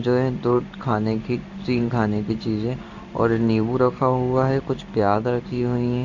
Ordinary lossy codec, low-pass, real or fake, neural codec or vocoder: none; 7.2 kHz; fake; codec, 16 kHz in and 24 kHz out, 1 kbps, XY-Tokenizer